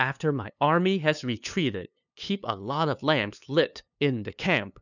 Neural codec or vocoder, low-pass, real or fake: codec, 16 kHz, 2 kbps, FunCodec, trained on LibriTTS, 25 frames a second; 7.2 kHz; fake